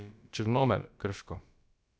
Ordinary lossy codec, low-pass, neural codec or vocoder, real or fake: none; none; codec, 16 kHz, about 1 kbps, DyCAST, with the encoder's durations; fake